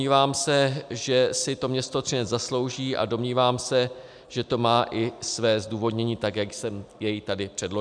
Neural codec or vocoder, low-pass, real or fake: none; 9.9 kHz; real